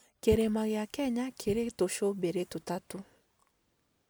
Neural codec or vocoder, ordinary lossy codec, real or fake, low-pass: none; none; real; none